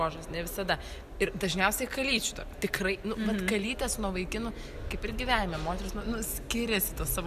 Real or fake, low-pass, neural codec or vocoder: real; 14.4 kHz; none